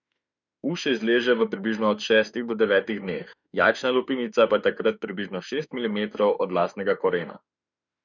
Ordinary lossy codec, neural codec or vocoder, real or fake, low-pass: none; autoencoder, 48 kHz, 32 numbers a frame, DAC-VAE, trained on Japanese speech; fake; 7.2 kHz